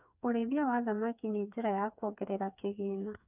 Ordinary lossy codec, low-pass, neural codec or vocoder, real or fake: none; 3.6 kHz; codec, 16 kHz, 4 kbps, FreqCodec, smaller model; fake